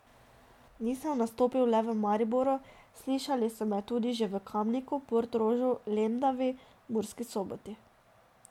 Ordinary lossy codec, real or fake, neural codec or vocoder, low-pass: MP3, 96 kbps; real; none; 19.8 kHz